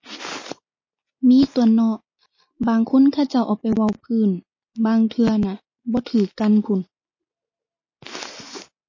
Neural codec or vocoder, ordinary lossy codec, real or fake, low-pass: none; MP3, 32 kbps; real; 7.2 kHz